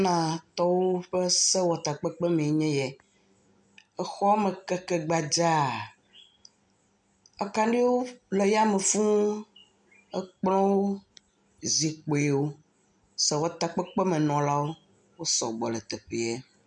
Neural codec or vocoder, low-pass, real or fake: none; 9.9 kHz; real